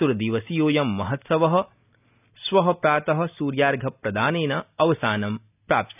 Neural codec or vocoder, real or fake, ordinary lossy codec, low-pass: none; real; none; 3.6 kHz